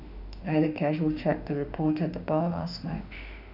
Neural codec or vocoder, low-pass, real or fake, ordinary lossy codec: autoencoder, 48 kHz, 32 numbers a frame, DAC-VAE, trained on Japanese speech; 5.4 kHz; fake; none